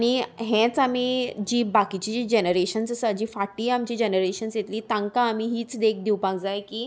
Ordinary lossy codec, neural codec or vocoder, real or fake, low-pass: none; none; real; none